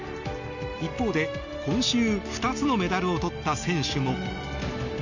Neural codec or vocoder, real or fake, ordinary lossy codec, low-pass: none; real; none; 7.2 kHz